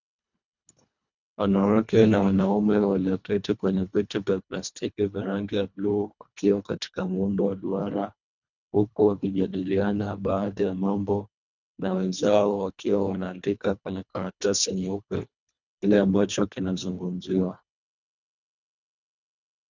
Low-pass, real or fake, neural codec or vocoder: 7.2 kHz; fake; codec, 24 kHz, 1.5 kbps, HILCodec